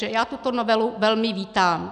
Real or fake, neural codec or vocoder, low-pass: real; none; 9.9 kHz